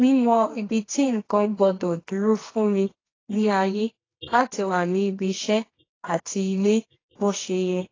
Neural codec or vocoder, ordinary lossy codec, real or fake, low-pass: codec, 24 kHz, 0.9 kbps, WavTokenizer, medium music audio release; AAC, 32 kbps; fake; 7.2 kHz